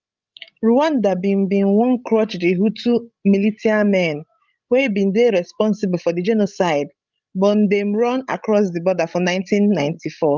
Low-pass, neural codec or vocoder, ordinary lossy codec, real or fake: 7.2 kHz; codec, 16 kHz, 16 kbps, FreqCodec, larger model; Opus, 32 kbps; fake